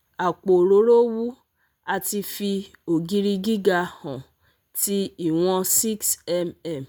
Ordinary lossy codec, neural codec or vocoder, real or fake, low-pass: none; none; real; none